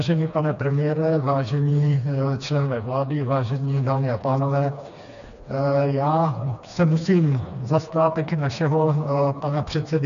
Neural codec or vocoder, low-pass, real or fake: codec, 16 kHz, 2 kbps, FreqCodec, smaller model; 7.2 kHz; fake